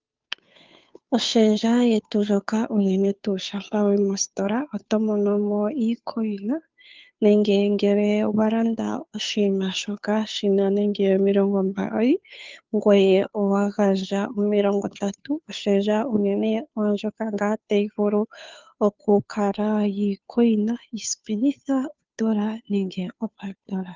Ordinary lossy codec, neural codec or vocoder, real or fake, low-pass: Opus, 32 kbps; codec, 16 kHz, 2 kbps, FunCodec, trained on Chinese and English, 25 frames a second; fake; 7.2 kHz